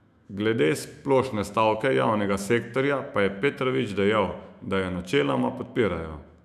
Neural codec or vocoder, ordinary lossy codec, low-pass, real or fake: autoencoder, 48 kHz, 128 numbers a frame, DAC-VAE, trained on Japanese speech; none; 14.4 kHz; fake